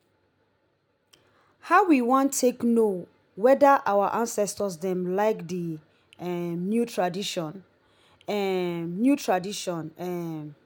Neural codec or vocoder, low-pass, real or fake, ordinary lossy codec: none; none; real; none